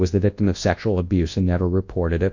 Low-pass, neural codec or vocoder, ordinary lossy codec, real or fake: 7.2 kHz; codec, 24 kHz, 0.9 kbps, WavTokenizer, large speech release; AAC, 48 kbps; fake